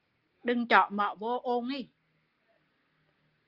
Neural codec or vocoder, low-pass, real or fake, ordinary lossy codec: none; 5.4 kHz; real; Opus, 32 kbps